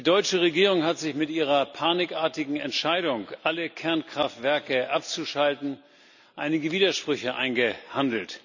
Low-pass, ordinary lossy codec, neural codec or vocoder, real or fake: 7.2 kHz; none; none; real